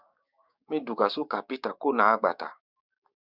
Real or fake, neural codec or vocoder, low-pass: fake; codec, 16 kHz, 6 kbps, DAC; 5.4 kHz